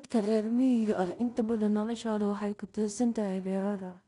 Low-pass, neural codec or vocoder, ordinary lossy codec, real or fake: 10.8 kHz; codec, 16 kHz in and 24 kHz out, 0.4 kbps, LongCat-Audio-Codec, two codebook decoder; none; fake